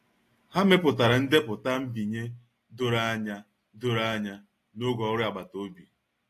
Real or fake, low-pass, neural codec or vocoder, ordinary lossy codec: real; 14.4 kHz; none; AAC, 48 kbps